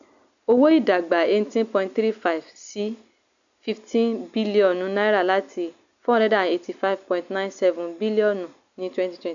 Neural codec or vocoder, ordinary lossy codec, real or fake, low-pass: none; none; real; 7.2 kHz